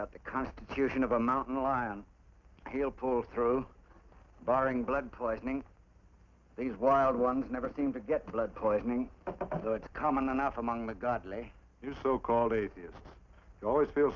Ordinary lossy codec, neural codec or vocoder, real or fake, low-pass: Opus, 24 kbps; none; real; 7.2 kHz